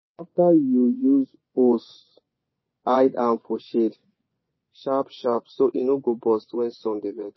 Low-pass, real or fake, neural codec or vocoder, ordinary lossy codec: 7.2 kHz; fake; vocoder, 24 kHz, 100 mel bands, Vocos; MP3, 24 kbps